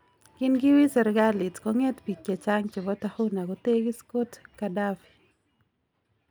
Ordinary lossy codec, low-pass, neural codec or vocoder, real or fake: none; none; none; real